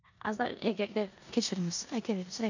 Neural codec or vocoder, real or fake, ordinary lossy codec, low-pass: codec, 16 kHz in and 24 kHz out, 0.9 kbps, LongCat-Audio-Codec, four codebook decoder; fake; none; 7.2 kHz